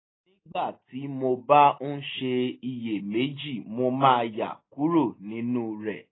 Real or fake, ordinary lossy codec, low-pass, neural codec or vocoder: real; AAC, 16 kbps; 7.2 kHz; none